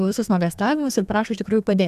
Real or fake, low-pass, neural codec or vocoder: fake; 14.4 kHz; codec, 32 kHz, 1.9 kbps, SNAC